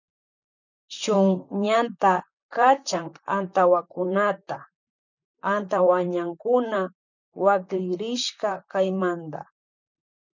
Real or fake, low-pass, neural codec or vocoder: fake; 7.2 kHz; vocoder, 44.1 kHz, 128 mel bands, Pupu-Vocoder